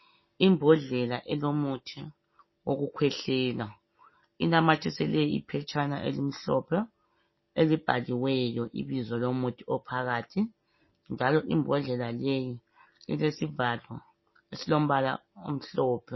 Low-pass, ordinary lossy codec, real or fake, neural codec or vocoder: 7.2 kHz; MP3, 24 kbps; real; none